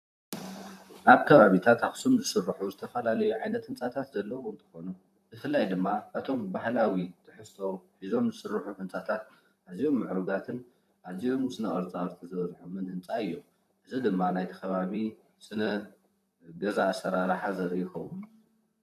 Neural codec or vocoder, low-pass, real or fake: vocoder, 44.1 kHz, 128 mel bands, Pupu-Vocoder; 14.4 kHz; fake